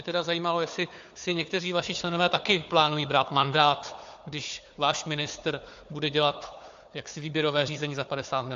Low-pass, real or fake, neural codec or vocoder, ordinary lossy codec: 7.2 kHz; fake; codec, 16 kHz, 4 kbps, FunCodec, trained on Chinese and English, 50 frames a second; AAC, 64 kbps